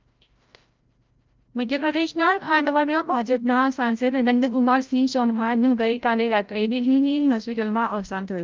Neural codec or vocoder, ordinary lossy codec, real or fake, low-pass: codec, 16 kHz, 0.5 kbps, FreqCodec, larger model; Opus, 24 kbps; fake; 7.2 kHz